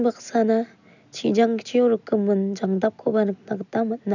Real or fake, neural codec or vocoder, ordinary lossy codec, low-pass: real; none; none; 7.2 kHz